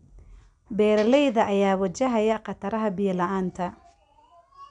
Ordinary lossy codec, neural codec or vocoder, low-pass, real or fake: none; none; 9.9 kHz; real